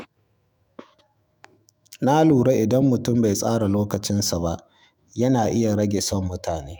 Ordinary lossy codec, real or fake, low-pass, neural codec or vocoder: none; fake; none; autoencoder, 48 kHz, 128 numbers a frame, DAC-VAE, trained on Japanese speech